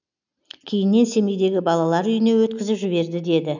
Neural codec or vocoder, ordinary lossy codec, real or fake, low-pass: none; none; real; 7.2 kHz